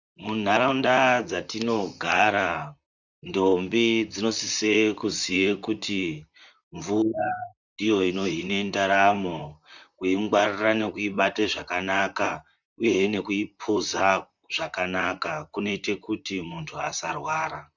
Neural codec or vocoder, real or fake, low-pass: vocoder, 44.1 kHz, 128 mel bands, Pupu-Vocoder; fake; 7.2 kHz